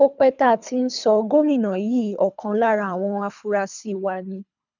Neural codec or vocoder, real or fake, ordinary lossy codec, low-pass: codec, 24 kHz, 3 kbps, HILCodec; fake; none; 7.2 kHz